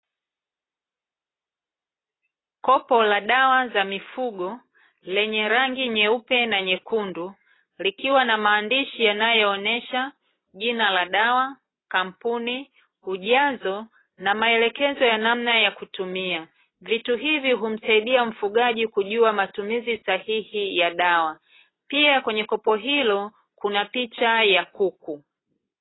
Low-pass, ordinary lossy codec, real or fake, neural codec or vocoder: 7.2 kHz; AAC, 16 kbps; real; none